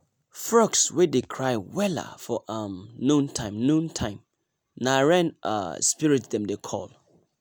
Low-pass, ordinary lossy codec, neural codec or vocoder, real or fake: none; none; none; real